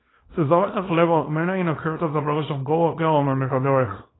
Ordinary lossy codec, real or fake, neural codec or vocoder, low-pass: AAC, 16 kbps; fake; codec, 24 kHz, 0.9 kbps, WavTokenizer, small release; 7.2 kHz